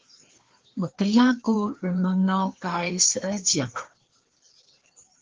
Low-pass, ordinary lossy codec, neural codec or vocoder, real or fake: 7.2 kHz; Opus, 16 kbps; codec, 16 kHz, 2 kbps, FreqCodec, larger model; fake